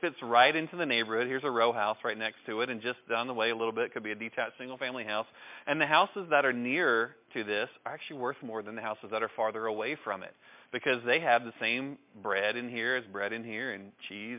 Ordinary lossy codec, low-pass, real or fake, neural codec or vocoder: MP3, 32 kbps; 3.6 kHz; real; none